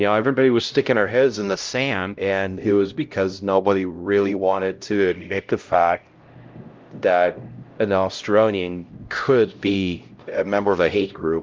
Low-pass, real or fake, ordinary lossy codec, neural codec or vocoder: 7.2 kHz; fake; Opus, 24 kbps; codec, 16 kHz, 0.5 kbps, X-Codec, HuBERT features, trained on LibriSpeech